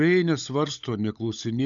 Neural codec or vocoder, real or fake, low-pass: codec, 16 kHz, 16 kbps, FunCodec, trained on LibriTTS, 50 frames a second; fake; 7.2 kHz